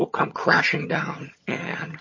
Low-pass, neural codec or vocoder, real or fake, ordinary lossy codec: 7.2 kHz; vocoder, 22.05 kHz, 80 mel bands, HiFi-GAN; fake; MP3, 32 kbps